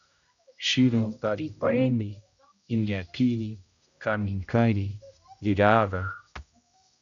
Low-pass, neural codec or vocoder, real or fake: 7.2 kHz; codec, 16 kHz, 0.5 kbps, X-Codec, HuBERT features, trained on general audio; fake